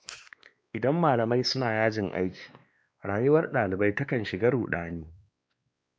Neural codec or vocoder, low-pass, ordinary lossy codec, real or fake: codec, 16 kHz, 2 kbps, X-Codec, WavLM features, trained on Multilingual LibriSpeech; none; none; fake